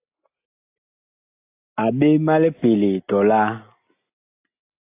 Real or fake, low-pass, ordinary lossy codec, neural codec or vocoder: real; 3.6 kHz; AAC, 24 kbps; none